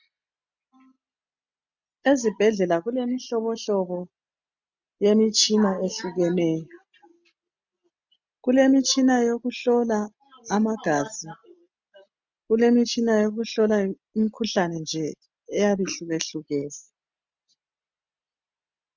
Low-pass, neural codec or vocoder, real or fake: 7.2 kHz; none; real